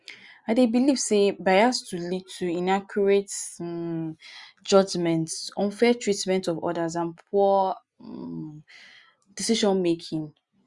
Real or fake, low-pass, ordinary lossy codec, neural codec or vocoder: real; 10.8 kHz; Opus, 64 kbps; none